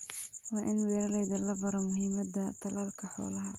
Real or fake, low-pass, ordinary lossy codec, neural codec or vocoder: real; 19.8 kHz; Opus, 32 kbps; none